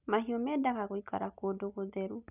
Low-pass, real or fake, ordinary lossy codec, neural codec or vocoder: 3.6 kHz; real; none; none